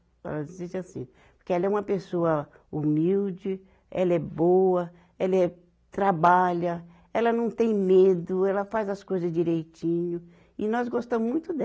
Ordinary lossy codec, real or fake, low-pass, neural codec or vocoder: none; real; none; none